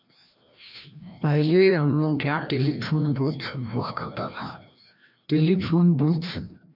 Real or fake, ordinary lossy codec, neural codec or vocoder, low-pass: fake; none; codec, 16 kHz, 1 kbps, FreqCodec, larger model; 5.4 kHz